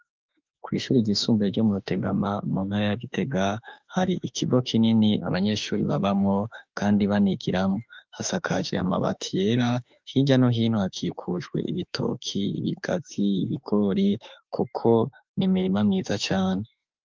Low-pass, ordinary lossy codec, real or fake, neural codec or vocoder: 7.2 kHz; Opus, 24 kbps; fake; autoencoder, 48 kHz, 32 numbers a frame, DAC-VAE, trained on Japanese speech